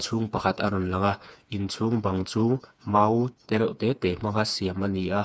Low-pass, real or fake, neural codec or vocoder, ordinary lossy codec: none; fake; codec, 16 kHz, 4 kbps, FreqCodec, smaller model; none